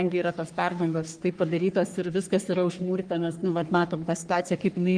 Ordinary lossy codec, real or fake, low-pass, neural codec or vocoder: Opus, 24 kbps; fake; 9.9 kHz; codec, 44.1 kHz, 3.4 kbps, Pupu-Codec